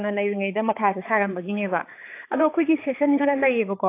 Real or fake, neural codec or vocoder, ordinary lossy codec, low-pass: fake; codec, 16 kHz, 4 kbps, X-Codec, HuBERT features, trained on balanced general audio; AAC, 24 kbps; 3.6 kHz